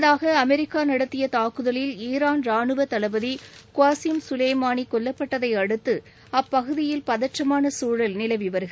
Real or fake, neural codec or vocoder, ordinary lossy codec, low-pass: real; none; none; none